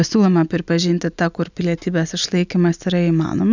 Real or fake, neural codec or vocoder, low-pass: real; none; 7.2 kHz